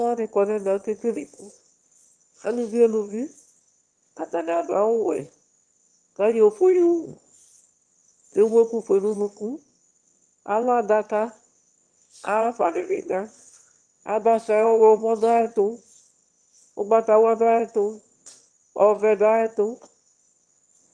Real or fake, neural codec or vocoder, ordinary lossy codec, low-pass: fake; autoencoder, 22.05 kHz, a latent of 192 numbers a frame, VITS, trained on one speaker; Opus, 24 kbps; 9.9 kHz